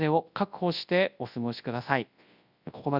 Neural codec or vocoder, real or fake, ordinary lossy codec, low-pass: codec, 24 kHz, 0.9 kbps, WavTokenizer, large speech release; fake; Opus, 64 kbps; 5.4 kHz